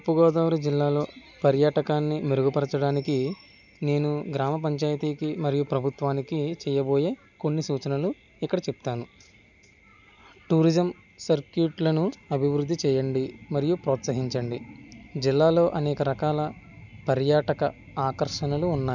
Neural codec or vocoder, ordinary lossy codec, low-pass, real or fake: none; none; 7.2 kHz; real